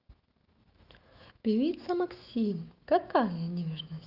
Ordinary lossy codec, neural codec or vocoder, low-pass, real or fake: Opus, 32 kbps; autoencoder, 48 kHz, 128 numbers a frame, DAC-VAE, trained on Japanese speech; 5.4 kHz; fake